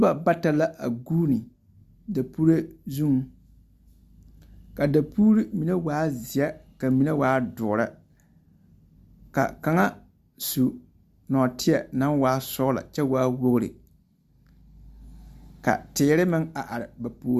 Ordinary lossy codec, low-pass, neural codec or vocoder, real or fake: Opus, 64 kbps; 14.4 kHz; none; real